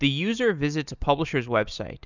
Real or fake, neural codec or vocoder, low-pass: real; none; 7.2 kHz